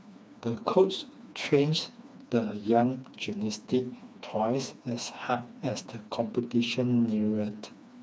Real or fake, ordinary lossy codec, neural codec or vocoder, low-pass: fake; none; codec, 16 kHz, 2 kbps, FreqCodec, smaller model; none